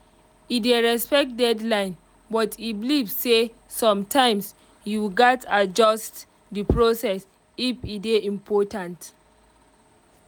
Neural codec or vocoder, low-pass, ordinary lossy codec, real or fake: none; none; none; real